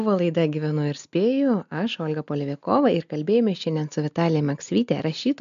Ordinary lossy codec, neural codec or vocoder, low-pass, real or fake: MP3, 64 kbps; none; 7.2 kHz; real